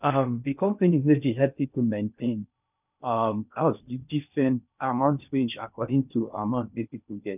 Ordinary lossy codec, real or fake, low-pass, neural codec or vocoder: none; fake; 3.6 kHz; codec, 16 kHz in and 24 kHz out, 0.6 kbps, FocalCodec, streaming, 2048 codes